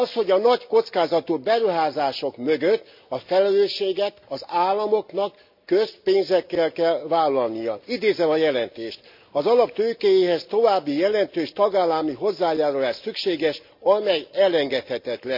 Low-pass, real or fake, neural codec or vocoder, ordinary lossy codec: 5.4 kHz; real; none; MP3, 48 kbps